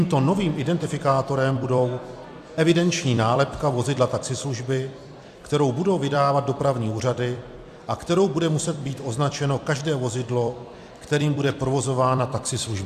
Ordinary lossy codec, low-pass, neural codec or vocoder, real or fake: AAC, 96 kbps; 14.4 kHz; vocoder, 44.1 kHz, 128 mel bands every 256 samples, BigVGAN v2; fake